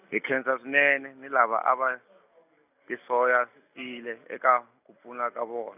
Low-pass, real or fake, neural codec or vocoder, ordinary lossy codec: 3.6 kHz; real; none; none